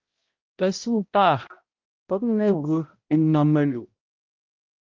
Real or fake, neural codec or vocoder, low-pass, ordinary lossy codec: fake; codec, 16 kHz, 0.5 kbps, X-Codec, HuBERT features, trained on balanced general audio; 7.2 kHz; Opus, 32 kbps